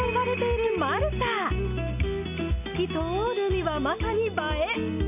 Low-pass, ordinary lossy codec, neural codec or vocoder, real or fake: 3.6 kHz; none; none; real